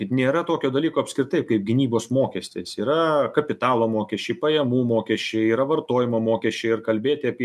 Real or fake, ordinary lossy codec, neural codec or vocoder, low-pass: real; MP3, 96 kbps; none; 14.4 kHz